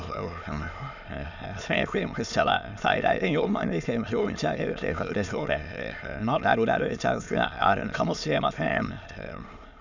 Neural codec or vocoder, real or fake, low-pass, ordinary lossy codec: autoencoder, 22.05 kHz, a latent of 192 numbers a frame, VITS, trained on many speakers; fake; 7.2 kHz; none